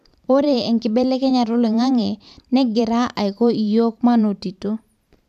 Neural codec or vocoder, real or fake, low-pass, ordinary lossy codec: vocoder, 44.1 kHz, 128 mel bands every 512 samples, BigVGAN v2; fake; 14.4 kHz; none